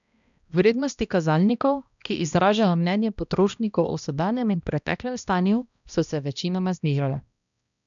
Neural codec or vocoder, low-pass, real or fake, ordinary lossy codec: codec, 16 kHz, 1 kbps, X-Codec, HuBERT features, trained on balanced general audio; 7.2 kHz; fake; none